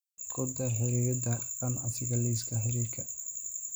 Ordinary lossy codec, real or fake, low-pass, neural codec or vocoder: none; real; none; none